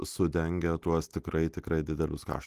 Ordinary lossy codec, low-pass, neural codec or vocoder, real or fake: Opus, 24 kbps; 14.4 kHz; none; real